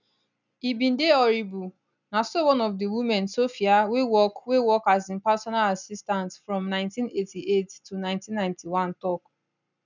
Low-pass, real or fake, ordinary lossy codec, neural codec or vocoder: 7.2 kHz; real; none; none